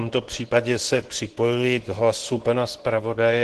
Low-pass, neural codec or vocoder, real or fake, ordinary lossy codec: 10.8 kHz; codec, 24 kHz, 0.9 kbps, WavTokenizer, medium speech release version 2; fake; Opus, 16 kbps